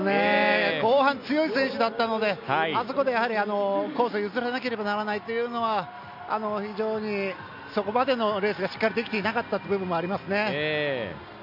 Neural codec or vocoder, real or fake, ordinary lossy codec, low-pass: none; real; MP3, 48 kbps; 5.4 kHz